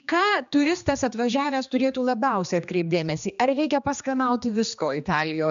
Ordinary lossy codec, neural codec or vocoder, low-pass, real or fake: AAC, 96 kbps; codec, 16 kHz, 2 kbps, X-Codec, HuBERT features, trained on general audio; 7.2 kHz; fake